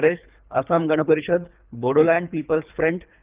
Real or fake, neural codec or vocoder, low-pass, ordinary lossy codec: fake; codec, 24 kHz, 3 kbps, HILCodec; 3.6 kHz; Opus, 24 kbps